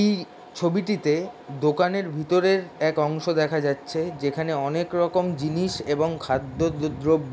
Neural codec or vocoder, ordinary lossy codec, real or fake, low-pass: none; none; real; none